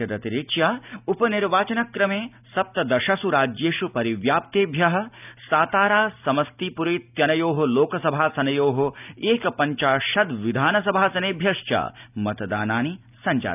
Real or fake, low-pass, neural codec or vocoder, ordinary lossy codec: real; 3.6 kHz; none; none